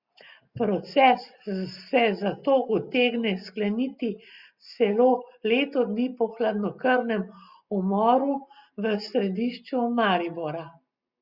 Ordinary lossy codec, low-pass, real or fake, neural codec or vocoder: Opus, 64 kbps; 5.4 kHz; real; none